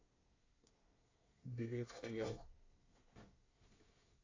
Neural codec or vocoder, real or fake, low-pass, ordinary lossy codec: codec, 24 kHz, 1 kbps, SNAC; fake; 7.2 kHz; AAC, 48 kbps